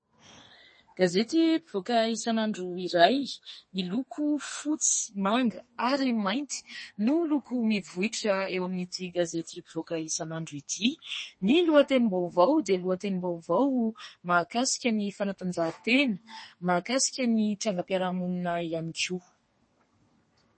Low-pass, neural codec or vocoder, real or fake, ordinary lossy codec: 9.9 kHz; codec, 32 kHz, 1.9 kbps, SNAC; fake; MP3, 32 kbps